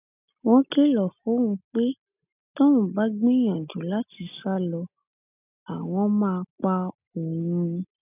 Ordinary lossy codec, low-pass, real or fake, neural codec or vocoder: none; 3.6 kHz; real; none